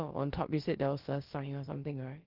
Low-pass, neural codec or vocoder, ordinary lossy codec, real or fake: 5.4 kHz; codec, 16 kHz, about 1 kbps, DyCAST, with the encoder's durations; Opus, 16 kbps; fake